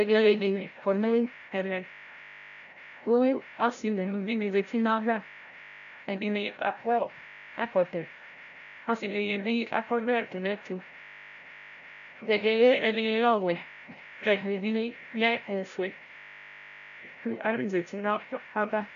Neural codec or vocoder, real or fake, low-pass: codec, 16 kHz, 0.5 kbps, FreqCodec, larger model; fake; 7.2 kHz